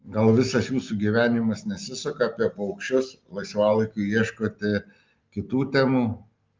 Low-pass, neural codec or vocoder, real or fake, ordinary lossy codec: 7.2 kHz; none; real; Opus, 24 kbps